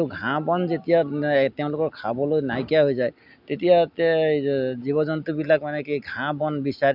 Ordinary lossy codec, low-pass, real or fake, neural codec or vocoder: none; 5.4 kHz; real; none